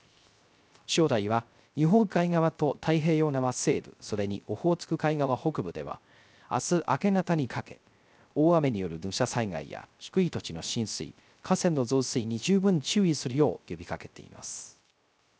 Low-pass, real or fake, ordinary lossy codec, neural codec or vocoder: none; fake; none; codec, 16 kHz, 0.3 kbps, FocalCodec